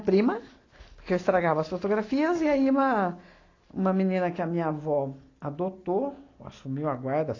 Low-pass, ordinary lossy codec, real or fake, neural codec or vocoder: 7.2 kHz; AAC, 32 kbps; real; none